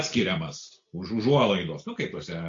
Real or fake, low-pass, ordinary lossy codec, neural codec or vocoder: real; 7.2 kHz; AAC, 48 kbps; none